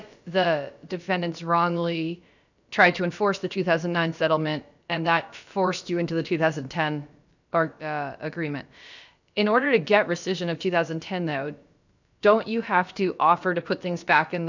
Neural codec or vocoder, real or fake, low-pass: codec, 16 kHz, about 1 kbps, DyCAST, with the encoder's durations; fake; 7.2 kHz